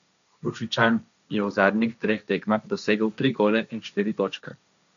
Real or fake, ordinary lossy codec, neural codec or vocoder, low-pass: fake; none; codec, 16 kHz, 1.1 kbps, Voila-Tokenizer; 7.2 kHz